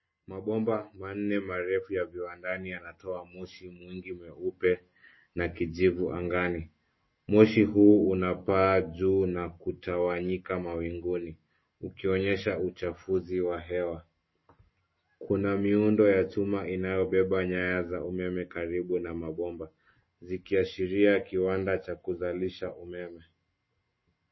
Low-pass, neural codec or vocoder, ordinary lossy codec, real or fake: 7.2 kHz; none; MP3, 24 kbps; real